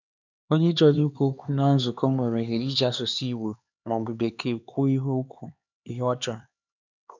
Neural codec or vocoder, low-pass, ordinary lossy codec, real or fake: codec, 16 kHz, 2 kbps, X-Codec, HuBERT features, trained on LibriSpeech; 7.2 kHz; none; fake